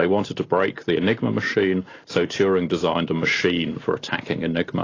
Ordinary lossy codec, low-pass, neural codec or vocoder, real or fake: AAC, 32 kbps; 7.2 kHz; none; real